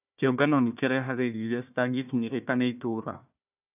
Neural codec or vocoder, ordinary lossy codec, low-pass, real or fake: codec, 16 kHz, 1 kbps, FunCodec, trained on Chinese and English, 50 frames a second; none; 3.6 kHz; fake